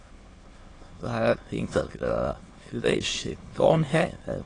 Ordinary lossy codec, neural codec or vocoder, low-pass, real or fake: AAC, 32 kbps; autoencoder, 22.05 kHz, a latent of 192 numbers a frame, VITS, trained on many speakers; 9.9 kHz; fake